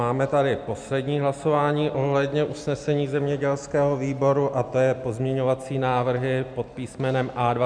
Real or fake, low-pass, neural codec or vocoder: real; 9.9 kHz; none